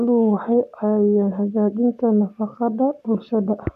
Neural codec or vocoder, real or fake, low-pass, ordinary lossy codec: codec, 44.1 kHz, 7.8 kbps, Pupu-Codec; fake; 14.4 kHz; none